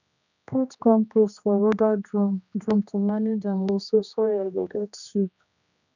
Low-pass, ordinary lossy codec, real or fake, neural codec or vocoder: 7.2 kHz; none; fake; codec, 16 kHz, 1 kbps, X-Codec, HuBERT features, trained on general audio